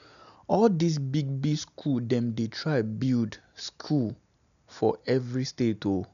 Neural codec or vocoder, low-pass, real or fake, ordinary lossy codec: none; 7.2 kHz; real; none